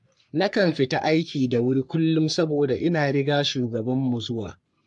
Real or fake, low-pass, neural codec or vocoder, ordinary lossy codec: fake; 10.8 kHz; codec, 44.1 kHz, 3.4 kbps, Pupu-Codec; AAC, 64 kbps